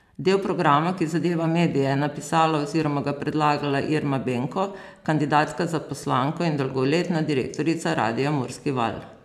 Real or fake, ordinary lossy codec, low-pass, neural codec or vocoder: fake; none; 14.4 kHz; vocoder, 44.1 kHz, 128 mel bands every 512 samples, BigVGAN v2